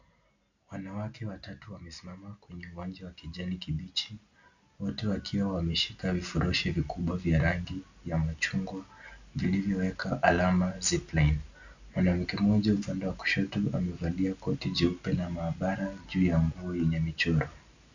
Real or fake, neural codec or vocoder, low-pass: real; none; 7.2 kHz